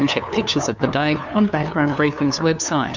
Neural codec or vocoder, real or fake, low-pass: codec, 16 kHz, 2 kbps, FunCodec, trained on LibriTTS, 25 frames a second; fake; 7.2 kHz